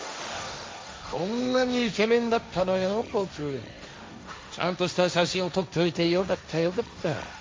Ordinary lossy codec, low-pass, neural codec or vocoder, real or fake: none; none; codec, 16 kHz, 1.1 kbps, Voila-Tokenizer; fake